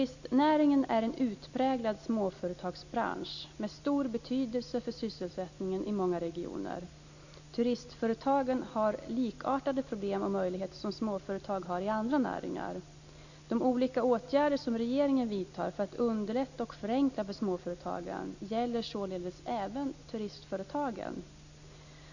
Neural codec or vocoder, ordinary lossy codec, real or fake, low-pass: none; none; real; 7.2 kHz